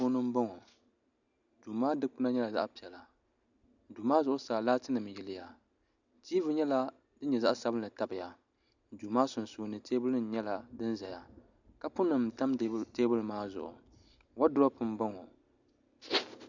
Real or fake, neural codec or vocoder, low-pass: real; none; 7.2 kHz